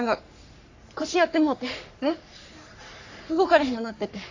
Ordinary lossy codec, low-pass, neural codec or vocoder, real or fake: AAC, 48 kbps; 7.2 kHz; codec, 44.1 kHz, 3.4 kbps, Pupu-Codec; fake